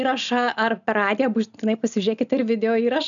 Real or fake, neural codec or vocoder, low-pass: real; none; 7.2 kHz